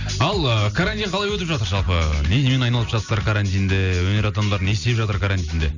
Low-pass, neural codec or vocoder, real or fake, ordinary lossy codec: 7.2 kHz; none; real; none